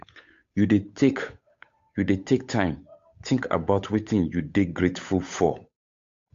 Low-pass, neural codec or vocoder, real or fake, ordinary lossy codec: 7.2 kHz; codec, 16 kHz, 8 kbps, FunCodec, trained on Chinese and English, 25 frames a second; fake; AAC, 64 kbps